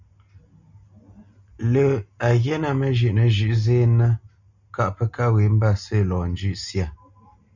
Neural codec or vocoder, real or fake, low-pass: none; real; 7.2 kHz